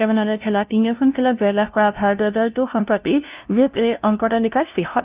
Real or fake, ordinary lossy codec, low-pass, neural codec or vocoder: fake; Opus, 32 kbps; 3.6 kHz; codec, 16 kHz, 0.5 kbps, FunCodec, trained on LibriTTS, 25 frames a second